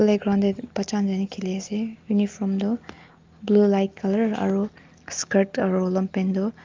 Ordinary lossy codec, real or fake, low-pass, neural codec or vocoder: Opus, 32 kbps; real; 7.2 kHz; none